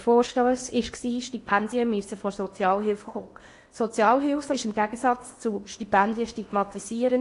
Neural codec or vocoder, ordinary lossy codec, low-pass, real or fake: codec, 16 kHz in and 24 kHz out, 0.8 kbps, FocalCodec, streaming, 65536 codes; AAC, 48 kbps; 10.8 kHz; fake